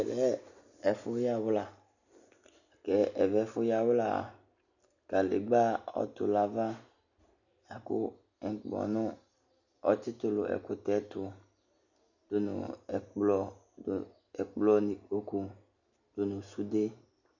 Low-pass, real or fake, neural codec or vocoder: 7.2 kHz; real; none